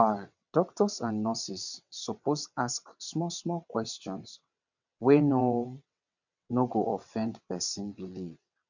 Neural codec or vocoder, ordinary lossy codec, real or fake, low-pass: vocoder, 22.05 kHz, 80 mel bands, WaveNeXt; none; fake; 7.2 kHz